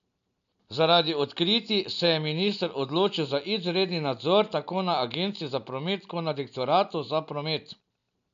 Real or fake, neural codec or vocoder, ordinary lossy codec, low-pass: real; none; none; 7.2 kHz